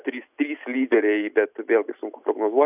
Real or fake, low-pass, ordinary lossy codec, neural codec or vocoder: real; 3.6 kHz; AAC, 32 kbps; none